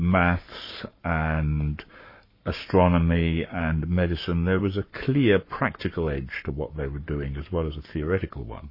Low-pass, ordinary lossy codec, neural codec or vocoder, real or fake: 5.4 kHz; MP3, 24 kbps; codec, 44.1 kHz, 7.8 kbps, Pupu-Codec; fake